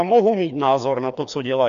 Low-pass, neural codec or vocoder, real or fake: 7.2 kHz; codec, 16 kHz, 2 kbps, FreqCodec, larger model; fake